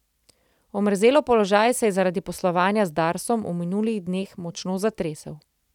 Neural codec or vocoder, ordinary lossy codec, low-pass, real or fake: none; none; 19.8 kHz; real